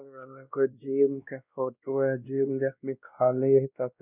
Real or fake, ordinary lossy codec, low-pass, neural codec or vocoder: fake; none; 3.6 kHz; codec, 16 kHz, 1 kbps, X-Codec, WavLM features, trained on Multilingual LibriSpeech